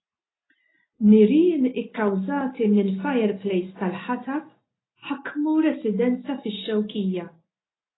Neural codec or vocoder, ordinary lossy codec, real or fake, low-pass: none; AAC, 16 kbps; real; 7.2 kHz